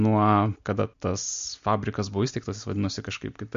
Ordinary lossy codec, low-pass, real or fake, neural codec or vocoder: MP3, 48 kbps; 7.2 kHz; real; none